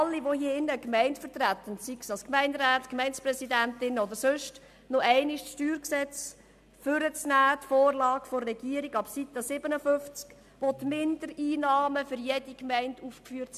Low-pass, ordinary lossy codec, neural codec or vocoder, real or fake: 14.4 kHz; MP3, 96 kbps; none; real